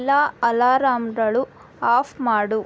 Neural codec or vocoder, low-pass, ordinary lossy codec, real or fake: none; none; none; real